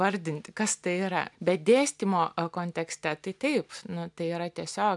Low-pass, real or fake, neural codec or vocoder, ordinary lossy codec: 10.8 kHz; real; none; MP3, 96 kbps